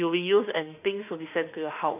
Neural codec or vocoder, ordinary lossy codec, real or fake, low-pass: autoencoder, 48 kHz, 32 numbers a frame, DAC-VAE, trained on Japanese speech; none; fake; 3.6 kHz